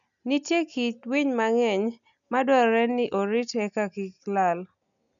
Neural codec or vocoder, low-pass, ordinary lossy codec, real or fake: none; 7.2 kHz; none; real